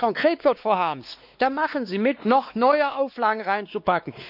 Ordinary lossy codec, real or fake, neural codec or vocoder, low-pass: none; fake; codec, 16 kHz, 2 kbps, X-Codec, WavLM features, trained on Multilingual LibriSpeech; 5.4 kHz